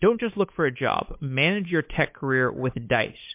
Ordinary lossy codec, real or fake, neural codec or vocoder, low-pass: MP3, 32 kbps; fake; codec, 16 kHz, 8 kbps, FunCodec, trained on Chinese and English, 25 frames a second; 3.6 kHz